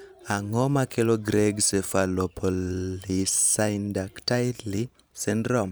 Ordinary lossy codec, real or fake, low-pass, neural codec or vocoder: none; fake; none; vocoder, 44.1 kHz, 128 mel bands every 512 samples, BigVGAN v2